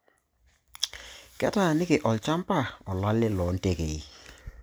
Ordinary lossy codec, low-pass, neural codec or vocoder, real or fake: none; none; none; real